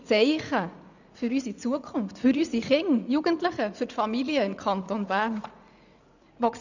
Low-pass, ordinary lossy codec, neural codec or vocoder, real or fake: 7.2 kHz; none; vocoder, 44.1 kHz, 80 mel bands, Vocos; fake